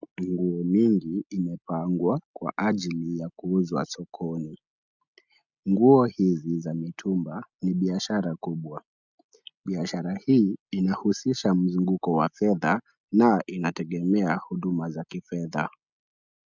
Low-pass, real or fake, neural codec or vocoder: 7.2 kHz; real; none